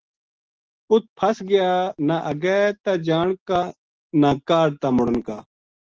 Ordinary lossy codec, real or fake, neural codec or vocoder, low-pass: Opus, 16 kbps; real; none; 7.2 kHz